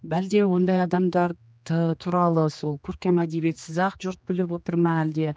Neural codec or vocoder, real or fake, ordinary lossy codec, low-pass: codec, 16 kHz, 2 kbps, X-Codec, HuBERT features, trained on general audio; fake; none; none